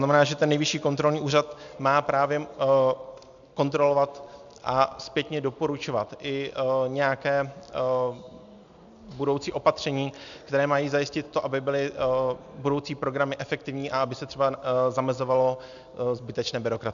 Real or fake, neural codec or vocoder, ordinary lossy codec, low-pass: real; none; MP3, 96 kbps; 7.2 kHz